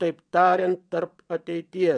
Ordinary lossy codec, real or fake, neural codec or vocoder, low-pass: MP3, 96 kbps; fake; vocoder, 22.05 kHz, 80 mel bands, WaveNeXt; 9.9 kHz